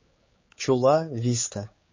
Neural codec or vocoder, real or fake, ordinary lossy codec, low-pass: codec, 16 kHz, 4 kbps, X-Codec, HuBERT features, trained on general audio; fake; MP3, 32 kbps; 7.2 kHz